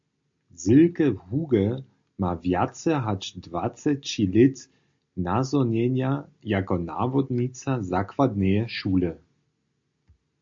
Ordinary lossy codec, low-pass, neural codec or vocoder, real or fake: MP3, 48 kbps; 7.2 kHz; none; real